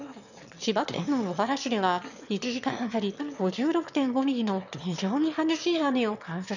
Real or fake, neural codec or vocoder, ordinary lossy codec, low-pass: fake; autoencoder, 22.05 kHz, a latent of 192 numbers a frame, VITS, trained on one speaker; none; 7.2 kHz